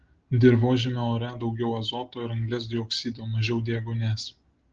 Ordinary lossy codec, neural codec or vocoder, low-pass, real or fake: Opus, 16 kbps; none; 7.2 kHz; real